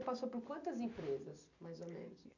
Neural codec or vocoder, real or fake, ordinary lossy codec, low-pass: none; real; none; 7.2 kHz